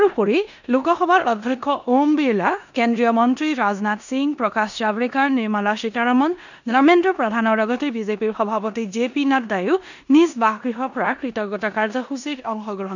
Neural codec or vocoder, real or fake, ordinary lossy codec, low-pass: codec, 16 kHz in and 24 kHz out, 0.9 kbps, LongCat-Audio-Codec, four codebook decoder; fake; none; 7.2 kHz